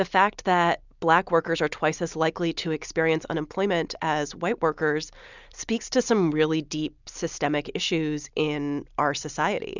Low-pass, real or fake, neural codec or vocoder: 7.2 kHz; real; none